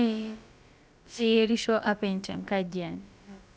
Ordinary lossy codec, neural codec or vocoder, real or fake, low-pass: none; codec, 16 kHz, about 1 kbps, DyCAST, with the encoder's durations; fake; none